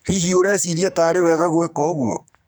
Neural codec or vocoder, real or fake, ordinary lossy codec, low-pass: codec, 44.1 kHz, 2.6 kbps, SNAC; fake; none; none